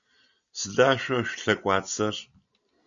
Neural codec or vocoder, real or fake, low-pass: none; real; 7.2 kHz